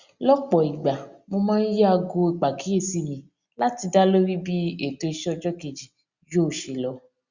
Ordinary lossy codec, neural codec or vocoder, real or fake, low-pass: Opus, 64 kbps; none; real; 7.2 kHz